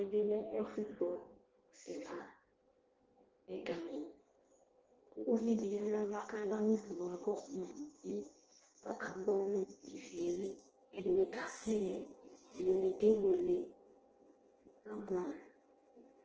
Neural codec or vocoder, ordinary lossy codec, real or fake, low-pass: codec, 16 kHz in and 24 kHz out, 0.6 kbps, FireRedTTS-2 codec; Opus, 16 kbps; fake; 7.2 kHz